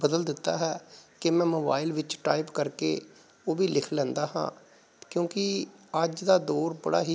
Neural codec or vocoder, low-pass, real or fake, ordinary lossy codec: none; none; real; none